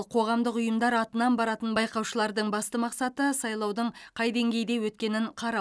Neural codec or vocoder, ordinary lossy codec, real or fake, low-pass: none; none; real; none